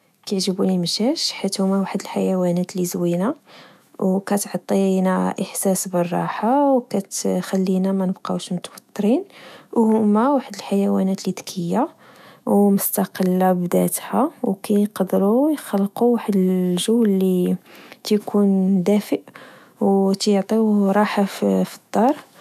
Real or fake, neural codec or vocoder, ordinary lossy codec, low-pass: fake; autoencoder, 48 kHz, 128 numbers a frame, DAC-VAE, trained on Japanese speech; none; 14.4 kHz